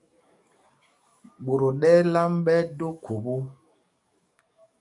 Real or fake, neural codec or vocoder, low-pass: fake; codec, 44.1 kHz, 7.8 kbps, DAC; 10.8 kHz